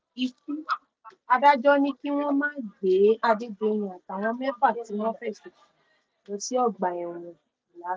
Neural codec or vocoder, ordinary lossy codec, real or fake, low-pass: none; none; real; none